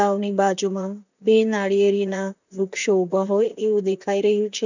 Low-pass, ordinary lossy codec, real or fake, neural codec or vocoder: 7.2 kHz; none; fake; vocoder, 44.1 kHz, 128 mel bands, Pupu-Vocoder